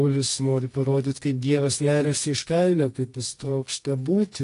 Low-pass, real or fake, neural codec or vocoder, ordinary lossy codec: 10.8 kHz; fake; codec, 24 kHz, 0.9 kbps, WavTokenizer, medium music audio release; AAC, 48 kbps